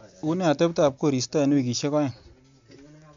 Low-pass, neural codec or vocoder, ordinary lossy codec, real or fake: 7.2 kHz; none; MP3, 64 kbps; real